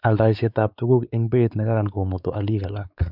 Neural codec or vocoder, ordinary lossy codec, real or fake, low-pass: codec, 16 kHz, 4 kbps, X-Codec, WavLM features, trained on Multilingual LibriSpeech; none; fake; 5.4 kHz